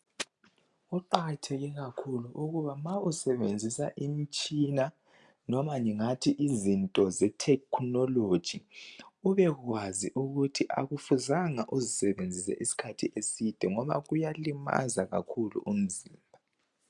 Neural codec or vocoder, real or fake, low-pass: none; real; 10.8 kHz